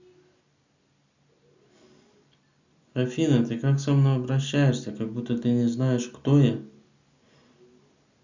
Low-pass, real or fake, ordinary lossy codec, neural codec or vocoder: 7.2 kHz; real; Opus, 64 kbps; none